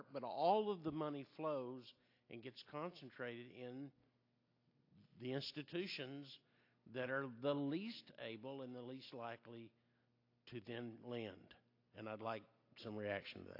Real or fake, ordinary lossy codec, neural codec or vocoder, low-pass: real; AAC, 32 kbps; none; 5.4 kHz